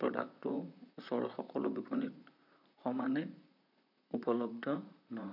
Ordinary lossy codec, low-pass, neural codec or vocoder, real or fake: none; 5.4 kHz; vocoder, 44.1 kHz, 128 mel bands, Pupu-Vocoder; fake